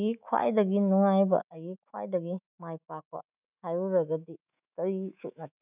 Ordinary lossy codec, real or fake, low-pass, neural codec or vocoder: none; fake; 3.6 kHz; autoencoder, 48 kHz, 128 numbers a frame, DAC-VAE, trained on Japanese speech